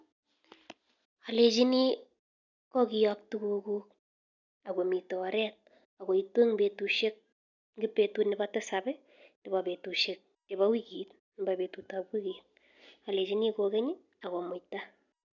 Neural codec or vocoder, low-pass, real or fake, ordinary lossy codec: none; 7.2 kHz; real; none